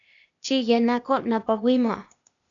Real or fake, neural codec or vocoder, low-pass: fake; codec, 16 kHz, 0.8 kbps, ZipCodec; 7.2 kHz